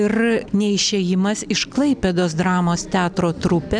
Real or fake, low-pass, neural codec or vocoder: real; 9.9 kHz; none